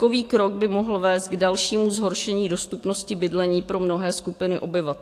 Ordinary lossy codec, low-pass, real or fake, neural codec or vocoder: AAC, 64 kbps; 14.4 kHz; fake; codec, 44.1 kHz, 7.8 kbps, DAC